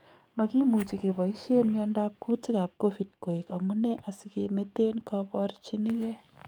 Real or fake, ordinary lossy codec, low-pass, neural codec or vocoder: fake; none; 19.8 kHz; codec, 44.1 kHz, 7.8 kbps, DAC